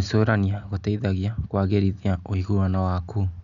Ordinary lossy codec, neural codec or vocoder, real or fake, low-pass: none; none; real; 7.2 kHz